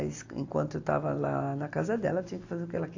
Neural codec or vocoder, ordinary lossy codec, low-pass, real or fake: none; AAC, 48 kbps; 7.2 kHz; real